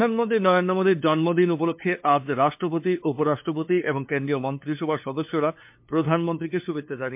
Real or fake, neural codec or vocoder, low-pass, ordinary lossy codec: fake; codec, 16 kHz, 2 kbps, FunCodec, trained on LibriTTS, 25 frames a second; 3.6 kHz; MP3, 32 kbps